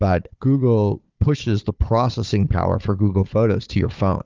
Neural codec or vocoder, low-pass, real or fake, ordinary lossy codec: codec, 16 kHz, 4 kbps, FunCodec, trained on Chinese and English, 50 frames a second; 7.2 kHz; fake; Opus, 24 kbps